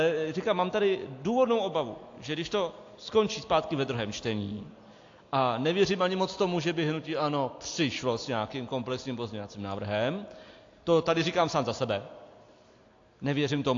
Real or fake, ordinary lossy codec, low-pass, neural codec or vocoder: real; AAC, 48 kbps; 7.2 kHz; none